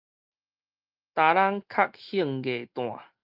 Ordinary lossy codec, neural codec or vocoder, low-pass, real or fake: Opus, 24 kbps; none; 5.4 kHz; real